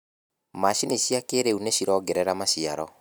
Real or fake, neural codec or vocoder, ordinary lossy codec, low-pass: real; none; none; none